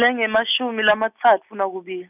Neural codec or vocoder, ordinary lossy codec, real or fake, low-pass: none; none; real; 3.6 kHz